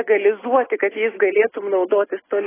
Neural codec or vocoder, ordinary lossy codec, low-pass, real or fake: none; AAC, 16 kbps; 3.6 kHz; real